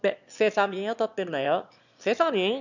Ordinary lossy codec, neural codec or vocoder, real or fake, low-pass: none; autoencoder, 22.05 kHz, a latent of 192 numbers a frame, VITS, trained on one speaker; fake; 7.2 kHz